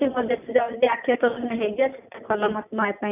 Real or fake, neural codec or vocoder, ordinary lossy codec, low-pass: real; none; none; 3.6 kHz